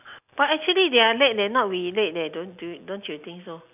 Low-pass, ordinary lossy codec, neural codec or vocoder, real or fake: 3.6 kHz; none; none; real